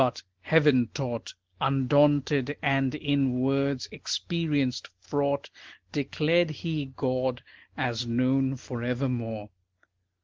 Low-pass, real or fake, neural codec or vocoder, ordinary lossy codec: 7.2 kHz; real; none; Opus, 16 kbps